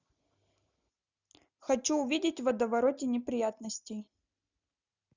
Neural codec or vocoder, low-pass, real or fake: none; 7.2 kHz; real